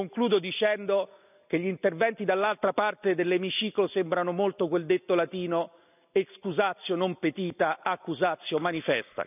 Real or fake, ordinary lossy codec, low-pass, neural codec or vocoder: real; none; 3.6 kHz; none